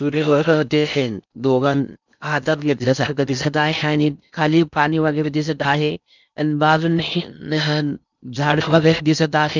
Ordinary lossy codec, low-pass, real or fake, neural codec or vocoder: none; 7.2 kHz; fake; codec, 16 kHz in and 24 kHz out, 0.6 kbps, FocalCodec, streaming, 2048 codes